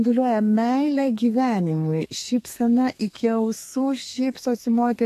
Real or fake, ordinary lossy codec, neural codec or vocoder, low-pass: fake; AAC, 64 kbps; codec, 32 kHz, 1.9 kbps, SNAC; 14.4 kHz